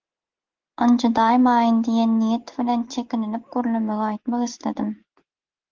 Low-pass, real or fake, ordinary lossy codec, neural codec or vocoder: 7.2 kHz; real; Opus, 16 kbps; none